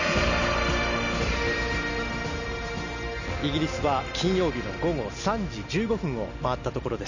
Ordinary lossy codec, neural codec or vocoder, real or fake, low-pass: none; none; real; 7.2 kHz